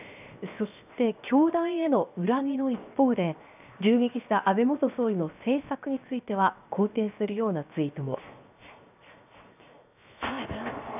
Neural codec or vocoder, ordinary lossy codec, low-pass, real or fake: codec, 16 kHz, 0.7 kbps, FocalCodec; none; 3.6 kHz; fake